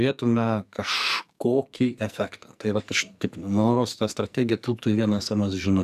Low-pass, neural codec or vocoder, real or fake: 14.4 kHz; codec, 44.1 kHz, 2.6 kbps, SNAC; fake